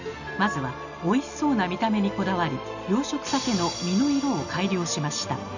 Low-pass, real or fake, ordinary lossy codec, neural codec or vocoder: 7.2 kHz; real; none; none